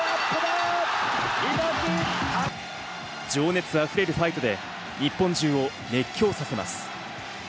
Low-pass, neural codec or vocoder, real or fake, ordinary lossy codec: none; none; real; none